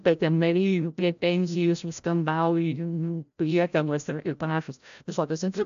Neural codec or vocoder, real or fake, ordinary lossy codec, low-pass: codec, 16 kHz, 0.5 kbps, FreqCodec, larger model; fake; AAC, 96 kbps; 7.2 kHz